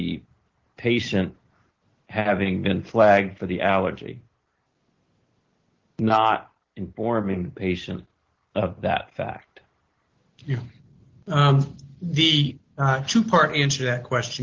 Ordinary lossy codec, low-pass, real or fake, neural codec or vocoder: Opus, 16 kbps; 7.2 kHz; real; none